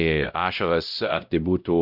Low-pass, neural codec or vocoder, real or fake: 5.4 kHz; codec, 16 kHz, 0.5 kbps, X-Codec, WavLM features, trained on Multilingual LibriSpeech; fake